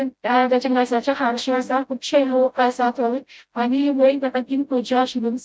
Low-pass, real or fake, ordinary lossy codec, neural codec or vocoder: none; fake; none; codec, 16 kHz, 0.5 kbps, FreqCodec, smaller model